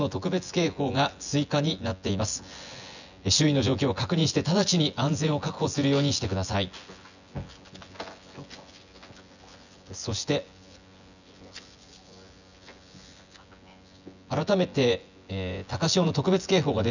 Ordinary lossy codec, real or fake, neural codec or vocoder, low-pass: none; fake; vocoder, 24 kHz, 100 mel bands, Vocos; 7.2 kHz